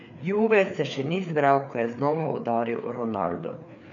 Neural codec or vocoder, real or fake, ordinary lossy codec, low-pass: codec, 16 kHz, 4 kbps, FreqCodec, larger model; fake; none; 7.2 kHz